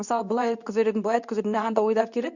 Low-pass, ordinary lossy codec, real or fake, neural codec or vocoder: 7.2 kHz; none; fake; codec, 24 kHz, 0.9 kbps, WavTokenizer, medium speech release version 2